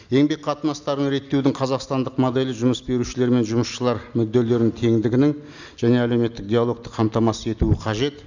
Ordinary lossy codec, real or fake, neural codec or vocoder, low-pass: none; real; none; 7.2 kHz